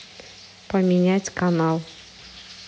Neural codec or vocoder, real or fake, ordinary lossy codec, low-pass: none; real; none; none